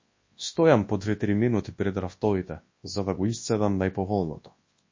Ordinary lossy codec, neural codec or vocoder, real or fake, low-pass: MP3, 32 kbps; codec, 24 kHz, 0.9 kbps, WavTokenizer, large speech release; fake; 7.2 kHz